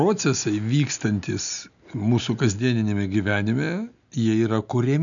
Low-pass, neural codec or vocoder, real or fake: 7.2 kHz; none; real